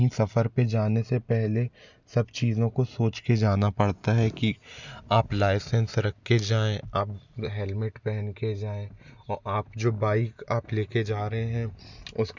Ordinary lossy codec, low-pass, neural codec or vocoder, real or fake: none; 7.2 kHz; none; real